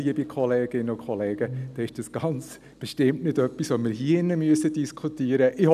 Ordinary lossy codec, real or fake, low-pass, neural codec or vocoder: none; real; 14.4 kHz; none